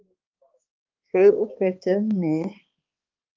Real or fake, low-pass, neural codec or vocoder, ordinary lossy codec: fake; 7.2 kHz; codec, 16 kHz, 2 kbps, X-Codec, HuBERT features, trained on balanced general audio; Opus, 24 kbps